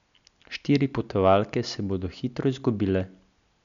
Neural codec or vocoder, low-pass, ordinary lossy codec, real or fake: none; 7.2 kHz; none; real